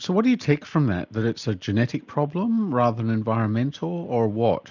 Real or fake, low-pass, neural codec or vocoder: real; 7.2 kHz; none